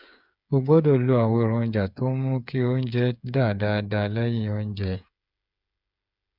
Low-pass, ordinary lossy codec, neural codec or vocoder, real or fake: 5.4 kHz; none; codec, 16 kHz, 8 kbps, FreqCodec, smaller model; fake